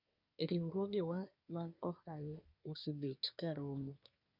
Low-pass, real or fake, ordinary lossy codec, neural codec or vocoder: 5.4 kHz; fake; none; codec, 24 kHz, 1 kbps, SNAC